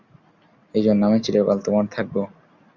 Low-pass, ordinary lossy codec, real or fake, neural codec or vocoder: 7.2 kHz; Opus, 64 kbps; real; none